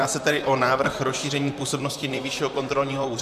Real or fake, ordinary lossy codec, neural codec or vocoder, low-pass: fake; MP3, 96 kbps; vocoder, 44.1 kHz, 128 mel bands, Pupu-Vocoder; 14.4 kHz